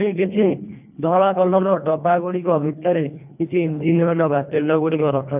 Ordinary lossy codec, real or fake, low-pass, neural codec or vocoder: none; fake; 3.6 kHz; codec, 24 kHz, 1.5 kbps, HILCodec